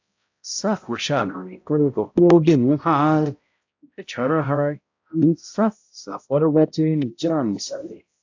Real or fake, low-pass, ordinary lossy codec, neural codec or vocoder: fake; 7.2 kHz; AAC, 48 kbps; codec, 16 kHz, 0.5 kbps, X-Codec, HuBERT features, trained on balanced general audio